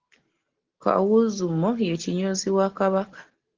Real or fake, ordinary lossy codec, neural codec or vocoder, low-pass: real; Opus, 16 kbps; none; 7.2 kHz